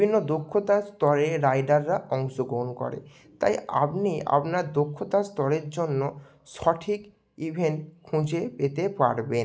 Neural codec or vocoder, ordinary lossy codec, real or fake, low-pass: none; none; real; none